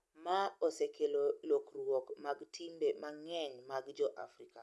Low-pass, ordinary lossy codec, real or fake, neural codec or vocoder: none; none; real; none